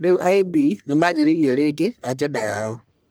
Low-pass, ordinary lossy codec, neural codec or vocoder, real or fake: none; none; codec, 44.1 kHz, 1.7 kbps, Pupu-Codec; fake